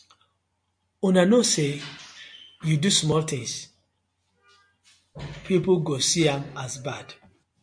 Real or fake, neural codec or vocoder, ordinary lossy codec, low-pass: real; none; MP3, 64 kbps; 9.9 kHz